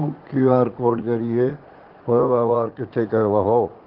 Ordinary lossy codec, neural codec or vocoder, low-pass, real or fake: Opus, 16 kbps; vocoder, 44.1 kHz, 80 mel bands, Vocos; 5.4 kHz; fake